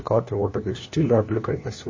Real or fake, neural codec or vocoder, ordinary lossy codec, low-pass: fake; codec, 16 kHz, 1 kbps, FunCodec, trained on LibriTTS, 50 frames a second; MP3, 32 kbps; 7.2 kHz